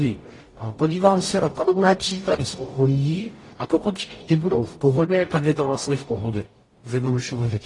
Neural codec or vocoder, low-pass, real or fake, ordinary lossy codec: codec, 44.1 kHz, 0.9 kbps, DAC; 10.8 kHz; fake; AAC, 32 kbps